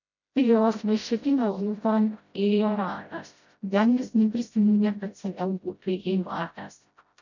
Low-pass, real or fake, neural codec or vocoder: 7.2 kHz; fake; codec, 16 kHz, 0.5 kbps, FreqCodec, smaller model